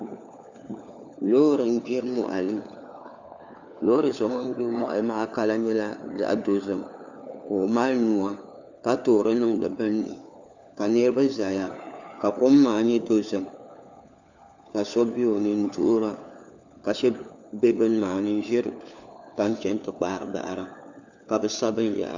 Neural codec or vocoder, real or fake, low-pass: codec, 16 kHz, 4 kbps, FunCodec, trained on LibriTTS, 50 frames a second; fake; 7.2 kHz